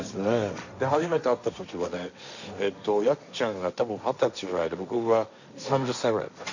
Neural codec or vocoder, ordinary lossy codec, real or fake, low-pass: codec, 16 kHz, 1.1 kbps, Voila-Tokenizer; none; fake; 7.2 kHz